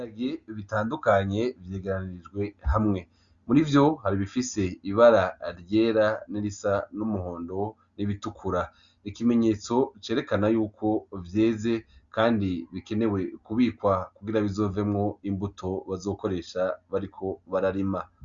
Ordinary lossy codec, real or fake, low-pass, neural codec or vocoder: Opus, 64 kbps; real; 7.2 kHz; none